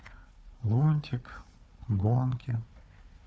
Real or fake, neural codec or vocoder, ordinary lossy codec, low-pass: fake; codec, 16 kHz, 4 kbps, FunCodec, trained on Chinese and English, 50 frames a second; none; none